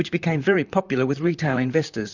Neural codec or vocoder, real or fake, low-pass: vocoder, 44.1 kHz, 128 mel bands, Pupu-Vocoder; fake; 7.2 kHz